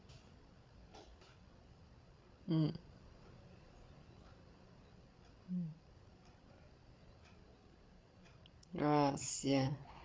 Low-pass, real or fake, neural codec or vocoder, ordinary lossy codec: none; fake; codec, 16 kHz, 8 kbps, FreqCodec, larger model; none